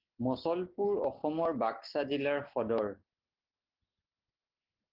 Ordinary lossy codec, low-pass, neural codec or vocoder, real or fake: Opus, 16 kbps; 5.4 kHz; none; real